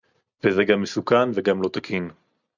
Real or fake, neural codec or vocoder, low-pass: real; none; 7.2 kHz